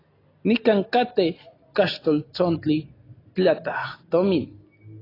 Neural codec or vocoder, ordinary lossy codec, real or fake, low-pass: none; AAC, 24 kbps; real; 5.4 kHz